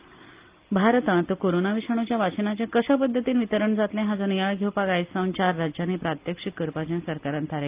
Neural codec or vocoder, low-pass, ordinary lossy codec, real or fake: none; 3.6 kHz; Opus, 32 kbps; real